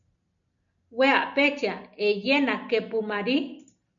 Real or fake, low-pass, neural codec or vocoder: real; 7.2 kHz; none